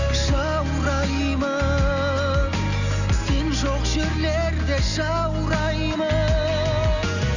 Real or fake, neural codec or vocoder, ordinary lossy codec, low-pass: real; none; none; 7.2 kHz